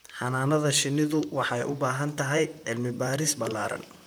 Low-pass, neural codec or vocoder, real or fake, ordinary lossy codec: none; vocoder, 44.1 kHz, 128 mel bands, Pupu-Vocoder; fake; none